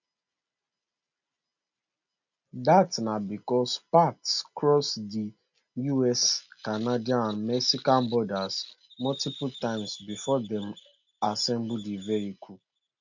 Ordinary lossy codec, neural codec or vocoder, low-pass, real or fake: none; none; 7.2 kHz; real